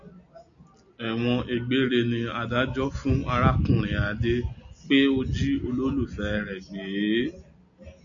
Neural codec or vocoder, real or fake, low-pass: none; real; 7.2 kHz